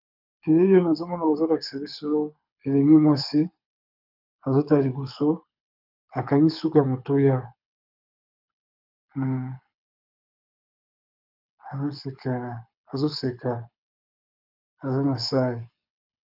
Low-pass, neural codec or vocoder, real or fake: 5.4 kHz; codec, 24 kHz, 6 kbps, HILCodec; fake